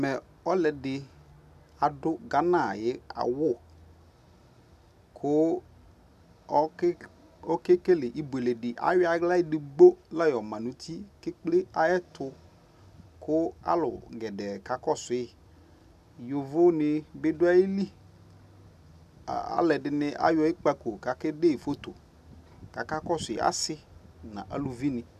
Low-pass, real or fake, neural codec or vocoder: 14.4 kHz; real; none